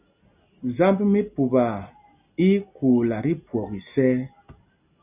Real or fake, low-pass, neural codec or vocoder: real; 3.6 kHz; none